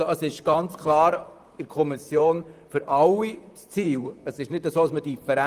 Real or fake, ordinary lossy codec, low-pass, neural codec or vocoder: fake; Opus, 32 kbps; 14.4 kHz; vocoder, 44.1 kHz, 128 mel bands, Pupu-Vocoder